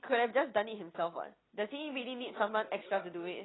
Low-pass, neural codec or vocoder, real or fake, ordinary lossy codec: 7.2 kHz; none; real; AAC, 16 kbps